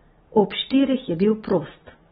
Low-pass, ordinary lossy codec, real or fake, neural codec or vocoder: 19.8 kHz; AAC, 16 kbps; real; none